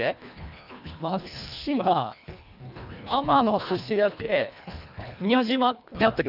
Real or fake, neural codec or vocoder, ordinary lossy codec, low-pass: fake; codec, 24 kHz, 1.5 kbps, HILCodec; AAC, 48 kbps; 5.4 kHz